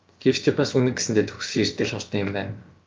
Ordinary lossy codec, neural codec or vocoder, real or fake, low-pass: Opus, 24 kbps; codec, 16 kHz, about 1 kbps, DyCAST, with the encoder's durations; fake; 7.2 kHz